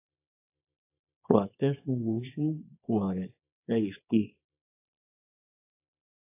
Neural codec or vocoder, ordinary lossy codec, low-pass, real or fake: codec, 24 kHz, 0.9 kbps, WavTokenizer, small release; AAC, 24 kbps; 3.6 kHz; fake